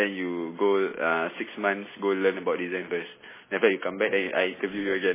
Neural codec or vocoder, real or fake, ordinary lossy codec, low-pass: none; real; MP3, 16 kbps; 3.6 kHz